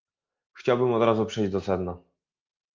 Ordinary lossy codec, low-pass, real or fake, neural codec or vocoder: Opus, 24 kbps; 7.2 kHz; real; none